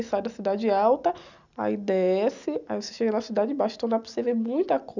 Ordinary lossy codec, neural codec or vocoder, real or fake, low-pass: none; none; real; 7.2 kHz